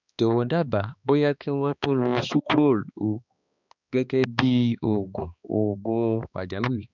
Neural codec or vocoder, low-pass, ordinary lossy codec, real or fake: codec, 16 kHz, 2 kbps, X-Codec, HuBERT features, trained on balanced general audio; 7.2 kHz; none; fake